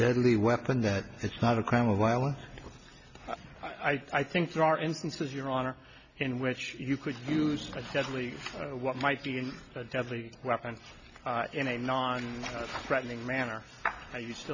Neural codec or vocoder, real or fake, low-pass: none; real; 7.2 kHz